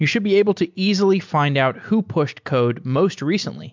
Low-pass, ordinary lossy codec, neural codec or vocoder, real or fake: 7.2 kHz; MP3, 64 kbps; none; real